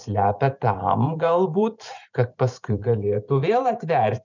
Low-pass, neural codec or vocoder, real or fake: 7.2 kHz; vocoder, 44.1 kHz, 128 mel bands every 512 samples, BigVGAN v2; fake